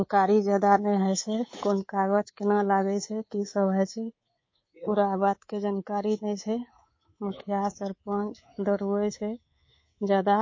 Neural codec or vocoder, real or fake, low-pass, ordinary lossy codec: codec, 24 kHz, 3.1 kbps, DualCodec; fake; 7.2 kHz; MP3, 32 kbps